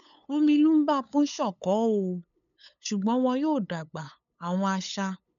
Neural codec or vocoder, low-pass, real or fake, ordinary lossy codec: codec, 16 kHz, 8 kbps, FunCodec, trained on LibriTTS, 25 frames a second; 7.2 kHz; fake; none